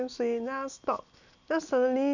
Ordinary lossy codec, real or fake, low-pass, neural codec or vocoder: none; real; 7.2 kHz; none